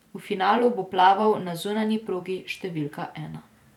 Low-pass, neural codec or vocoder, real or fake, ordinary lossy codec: 19.8 kHz; vocoder, 44.1 kHz, 128 mel bands every 512 samples, BigVGAN v2; fake; none